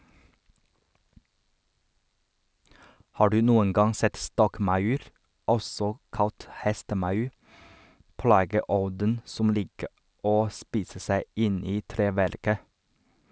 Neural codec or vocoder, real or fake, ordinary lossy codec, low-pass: none; real; none; none